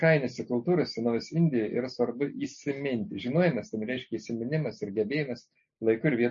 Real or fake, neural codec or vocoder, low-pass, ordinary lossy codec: real; none; 7.2 kHz; MP3, 32 kbps